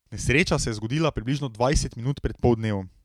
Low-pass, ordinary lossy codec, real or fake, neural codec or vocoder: 19.8 kHz; MP3, 96 kbps; real; none